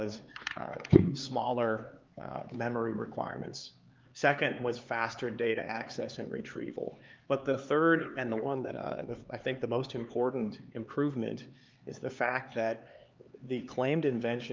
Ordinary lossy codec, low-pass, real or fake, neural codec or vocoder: Opus, 32 kbps; 7.2 kHz; fake; codec, 16 kHz, 4 kbps, X-Codec, HuBERT features, trained on LibriSpeech